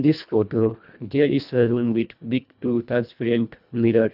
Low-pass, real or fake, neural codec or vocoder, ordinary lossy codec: 5.4 kHz; fake; codec, 24 kHz, 1.5 kbps, HILCodec; none